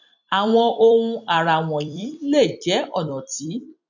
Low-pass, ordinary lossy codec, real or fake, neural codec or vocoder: 7.2 kHz; none; fake; vocoder, 44.1 kHz, 128 mel bands every 256 samples, BigVGAN v2